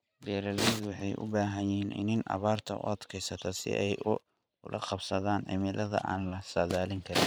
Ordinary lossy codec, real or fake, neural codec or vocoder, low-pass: none; fake; vocoder, 44.1 kHz, 128 mel bands every 512 samples, BigVGAN v2; none